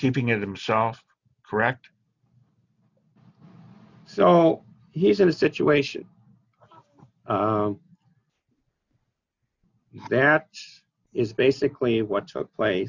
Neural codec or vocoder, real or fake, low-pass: none; real; 7.2 kHz